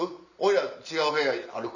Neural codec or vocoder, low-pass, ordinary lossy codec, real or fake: none; 7.2 kHz; none; real